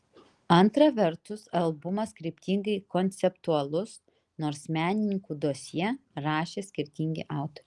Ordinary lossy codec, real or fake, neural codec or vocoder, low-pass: Opus, 24 kbps; real; none; 10.8 kHz